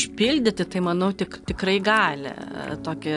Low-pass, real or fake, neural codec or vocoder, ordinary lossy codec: 10.8 kHz; real; none; AAC, 64 kbps